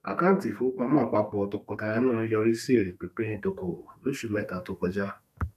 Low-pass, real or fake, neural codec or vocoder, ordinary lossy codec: 14.4 kHz; fake; codec, 32 kHz, 1.9 kbps, SNAC; none